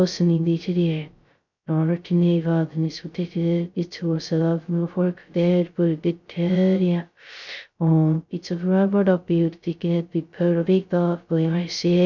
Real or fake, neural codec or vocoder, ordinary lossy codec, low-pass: fake; codec, 16 kHz, 0.2 kbps, FocalCodec; none; 7.2 kHz